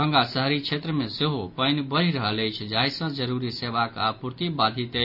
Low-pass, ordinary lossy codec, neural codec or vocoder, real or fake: 5.4 kHz; none; none; real